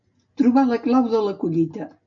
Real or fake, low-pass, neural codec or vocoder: real; 7.2 kHz; none